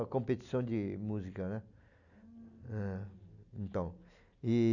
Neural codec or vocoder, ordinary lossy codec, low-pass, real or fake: none; none; 7.2 kHz; real